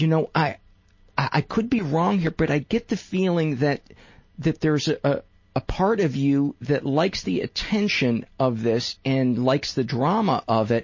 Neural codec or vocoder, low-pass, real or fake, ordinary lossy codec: none; 7.2 kHz; real; MP3, 32 kbps